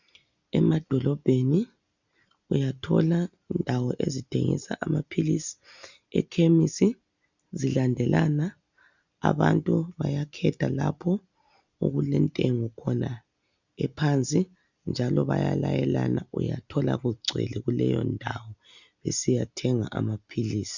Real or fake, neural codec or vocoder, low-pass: real; none; 7.2 kHz